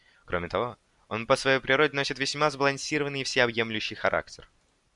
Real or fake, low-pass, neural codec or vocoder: real; 10.8 kHz; none